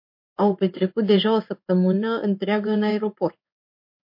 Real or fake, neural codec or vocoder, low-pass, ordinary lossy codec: fake; codec, 16 kHz in and 24 kHz out, 1 kbps, XY-Tokenizer; 5.4 kHz; MP3, 32 kbps